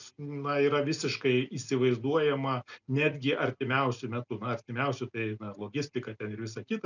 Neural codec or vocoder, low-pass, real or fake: none; 7.2 kHz; real